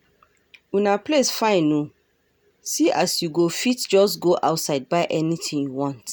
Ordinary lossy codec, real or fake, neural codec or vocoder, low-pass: none; real; none; none